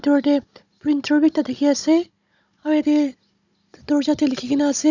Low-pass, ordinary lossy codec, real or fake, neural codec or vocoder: 7.2 kHz; none; fake; codec, 16 kHz, 16 kbps, FunCodec, trained on Chinese and English, 50 frames a second